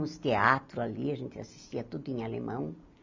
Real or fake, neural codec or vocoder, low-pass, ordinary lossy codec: real; none; 7.2 kHz; MP3, 64 kbps